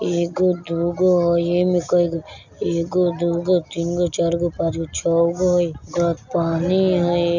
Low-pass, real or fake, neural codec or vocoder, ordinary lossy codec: 7.2 kHz; real; none; none